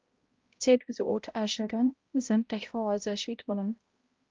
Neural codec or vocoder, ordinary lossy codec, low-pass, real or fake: codec, 16 kHz, 0.5 kbps, X-Codec, HuBERT features, trained on balanced general audio; Opus, 32 kbps; 7.2 kHz; fake